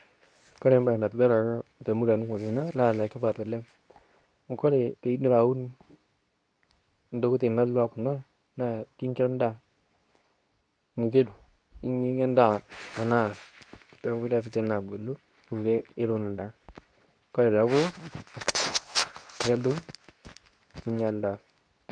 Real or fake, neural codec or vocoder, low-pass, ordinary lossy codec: fake; codec, 24 kHz, 0.9 kbps, WavTokenizer, medium speech release version 2; 9.9 kHz; none